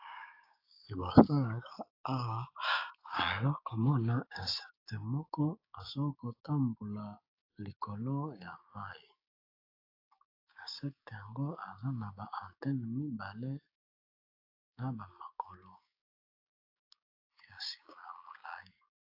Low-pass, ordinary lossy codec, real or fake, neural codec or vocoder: 5.4 kHz; AAC, 32 kbps; fake; codec, 16 kHz, 6 kbps, DAC